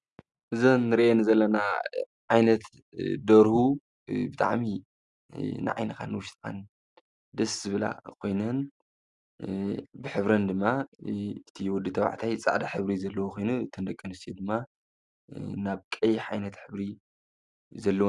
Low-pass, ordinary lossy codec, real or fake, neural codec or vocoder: 10.8 kHz; Opus, 64 kbps; real; none